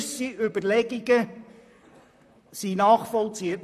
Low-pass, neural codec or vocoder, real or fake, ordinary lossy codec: 14.4 kHz; vocoder, 44.1 kHz, 128 mel bands, Pupu-Vocoder; fake; none